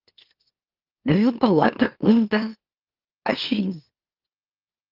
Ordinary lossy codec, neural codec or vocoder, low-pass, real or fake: Opus, 32 kbps; autoencoder, 44.1 kHz, a latent of 192 numbers a frame, MeloTTS; 5.4 kHz; fake